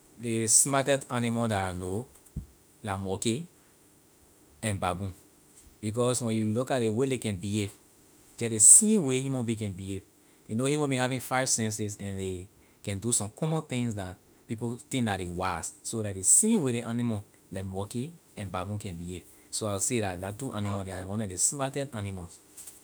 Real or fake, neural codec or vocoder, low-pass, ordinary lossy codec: fake; autoencoder, 48 kHz, 32 numbers a frame, DAC-VAE, trained on Japanese speech; none; none